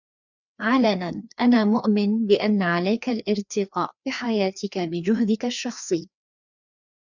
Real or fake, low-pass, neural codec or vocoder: fake; 7.2 kHz; codec, 16 kHz, 2 kbps, FreqCodec, larger model